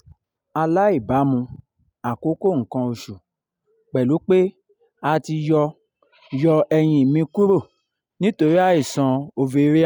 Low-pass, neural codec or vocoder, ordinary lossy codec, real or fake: 19.8 kHz; none; none; real